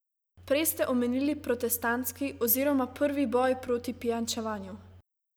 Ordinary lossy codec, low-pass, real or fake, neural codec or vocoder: none; none; real; none